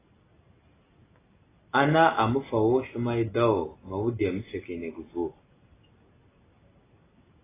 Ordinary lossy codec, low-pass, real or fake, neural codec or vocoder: AAC, 16 kbps; 3.6 kHz; real; none